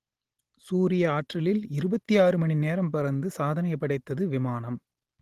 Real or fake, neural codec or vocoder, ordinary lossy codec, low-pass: real; none; Opus, 16 kbps; 14.4 kHz